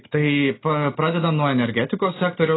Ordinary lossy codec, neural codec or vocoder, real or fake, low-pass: AAC, 16 kbps; none; real; 7.2 kHz